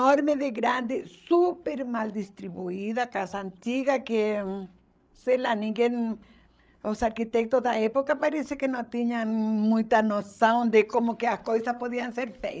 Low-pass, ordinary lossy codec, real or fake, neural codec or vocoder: none; none; fake; codec, 16 kHz, 8 kbps, FreqCodec, larger model